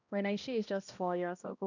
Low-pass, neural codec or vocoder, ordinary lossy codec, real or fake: 7.2 kHz; codec, 16 kHz, 1 kbps, X-Codec, HuBERT features, trained on balanced general audio; none; fake